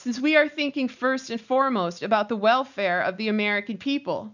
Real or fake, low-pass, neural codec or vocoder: real; 7.2 kHz; none